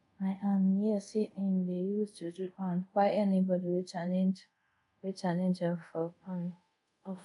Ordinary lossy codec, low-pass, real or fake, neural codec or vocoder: none; 10.8 kHz; fake; codec, 24 kHz, 0.5 kbps, DualCodec